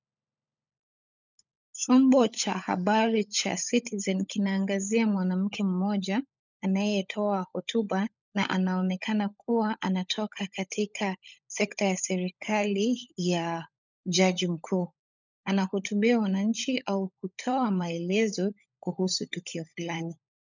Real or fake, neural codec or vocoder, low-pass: fake; codec, 16 kHz, 16 kbps, FunCodec, trained on LibriTTS, 50 frames a second; 7.2 kHz